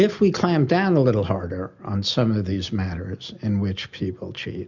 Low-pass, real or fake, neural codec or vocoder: 7.2 kHz; real; none